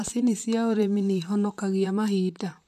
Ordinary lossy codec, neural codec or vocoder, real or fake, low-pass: MP3, 96 kbps; vocoder, 44.1 kHz, 128 mel bands, Pupu-Vocoder; fake; 14.4 kHz